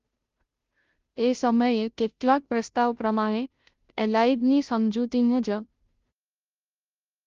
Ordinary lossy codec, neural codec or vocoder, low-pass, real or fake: Opus, 32 kbps; codec, 16 kHz, 0.5 kbps, FunCodec, trained on Chinese and English, 25 frames a second; 7.2 kHz; fake